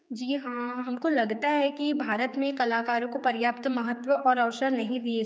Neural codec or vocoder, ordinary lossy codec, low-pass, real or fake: codec, 16 kHz, 4 kbps, X-Codec, HuBERT features, trained on general audio; none; none; fake